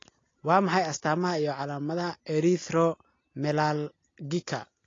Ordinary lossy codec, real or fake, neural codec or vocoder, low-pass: AAC, 32 kbps; real; none; 7.2 kHz